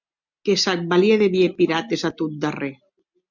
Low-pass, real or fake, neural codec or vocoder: 7.2 kHz; real; none